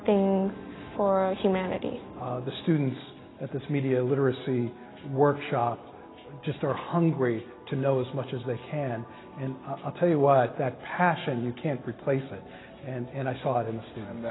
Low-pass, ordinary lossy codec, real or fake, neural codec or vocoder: 7.2 kHz; AAC, 16 kbps; real; none